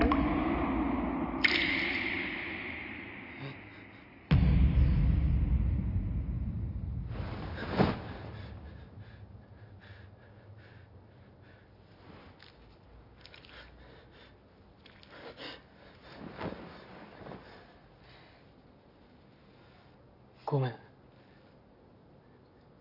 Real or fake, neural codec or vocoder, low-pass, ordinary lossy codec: real; none; 5.4 kHz; none